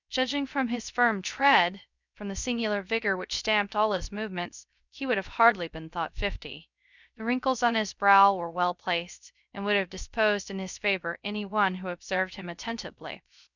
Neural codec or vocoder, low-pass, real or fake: codec, 16 kHz, 0.3 kbps, FocalCodec; 7.2 kHz; fake